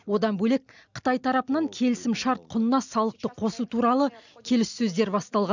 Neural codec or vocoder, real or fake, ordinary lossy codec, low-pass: none; real; none; 7.2 kHz